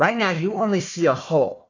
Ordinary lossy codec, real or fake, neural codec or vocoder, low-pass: AAC, 48 kbps; fake; codec, 16 kHz, 1 kbps, FunCodec, trained on Chinese and English, 50 frames a second; 7.2 kHz